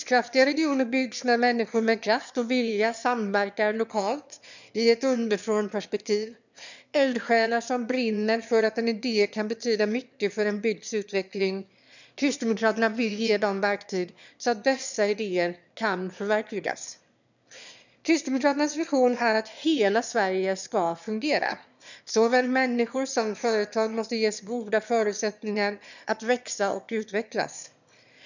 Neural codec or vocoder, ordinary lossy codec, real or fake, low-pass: autoencoder, 22.05 kHz, a latent of 192 numbers a frame, VITS, trained on one speaker; none; fake; 7.2 kHz